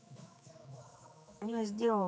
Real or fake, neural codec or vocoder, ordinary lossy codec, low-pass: fake; codec, 16 kHz, 4 kbps, X-Codec, HuBERT features, trained on general audio; none; none